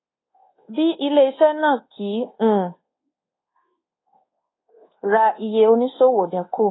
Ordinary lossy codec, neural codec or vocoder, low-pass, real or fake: AAC, 16 kbps; codec, 24 kHz, 1.2 kbps, DualCodec; 7.2 kHz; fake